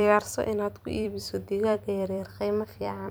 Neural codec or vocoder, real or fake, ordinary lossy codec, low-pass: vocoder, 44.1 kHz, 128 mel bands every 256 samples, BigVGAN v2; fake; none; none